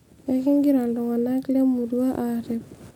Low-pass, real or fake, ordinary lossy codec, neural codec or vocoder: 19.8 kHz; real; none; none